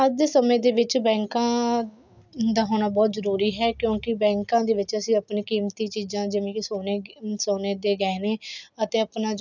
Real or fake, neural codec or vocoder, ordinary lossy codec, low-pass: real; none; none; 7.2 kHz